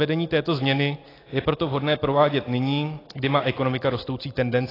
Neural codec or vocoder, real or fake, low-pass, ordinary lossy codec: none; real; 5.4 kHz; AAC, 24 kbps